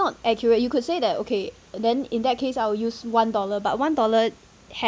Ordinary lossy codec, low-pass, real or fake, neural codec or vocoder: none; none; real; none